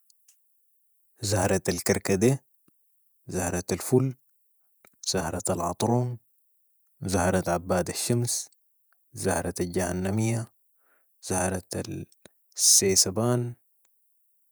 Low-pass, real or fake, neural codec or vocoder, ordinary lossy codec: none; fake; vocoder, 48 kHz, 128 mel bands, Vocos; none